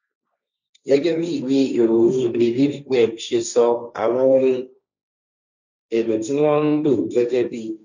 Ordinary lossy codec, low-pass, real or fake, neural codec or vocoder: none; 7.2 kHz; fake; codec, 16 kHz, 1.1 kbps, Voila-Tokenizer